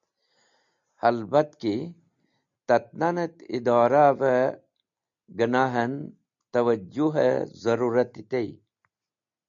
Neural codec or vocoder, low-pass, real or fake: none; 7.2 kHz; real